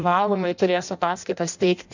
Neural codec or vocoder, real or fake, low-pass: codec, 16 kHz in and 24 kHz out, 0.6 kbps, FireRedTTS-2 codec; fake; 7.2 kHz